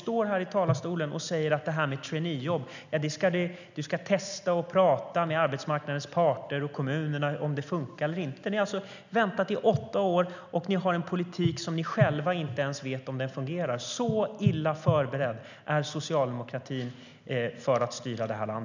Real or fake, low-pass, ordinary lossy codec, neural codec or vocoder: real; 7.2 kHz; none; none